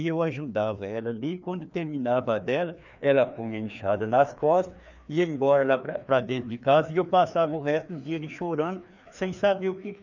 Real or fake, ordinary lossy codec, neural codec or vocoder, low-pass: fake; none; codec, 16 kHz, 2 kbps, FreqCodec, larger model; 7.2 kHz